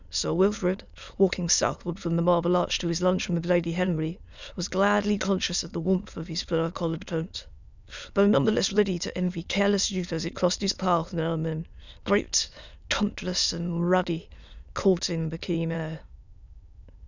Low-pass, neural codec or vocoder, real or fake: 7.2 kHz; autoencoder, 22.05 kHz, a latent of 192 numbers a frame, VITS, trained on many speakers; fake